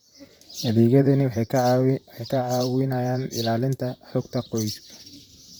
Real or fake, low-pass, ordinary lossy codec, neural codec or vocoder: fake; none; none; vocoder, 44.1 kHz, 128 mel bands, Pupu-Vocoder